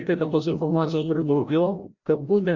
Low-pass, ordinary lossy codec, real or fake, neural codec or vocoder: 7.2 kHz; Opus, 64 kbps; fake; codec, 16 kHz, 0.5 kbps, FreqCodec, larger model